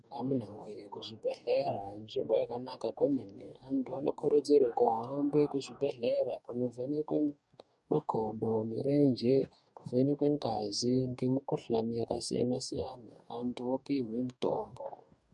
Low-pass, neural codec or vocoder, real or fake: 10.8 kHz; codec, 44.1 kHz, 2.6 kbps, DAC; fake